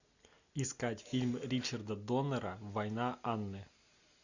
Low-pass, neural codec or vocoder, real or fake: 7.2 kHz; none; real